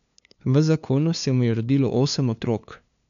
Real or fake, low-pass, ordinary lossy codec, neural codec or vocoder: fake; 7.2 kHz; none; codec, 16 kHz, 2 kbps, FunCodec, trained on LibriTTS, 25 frames a second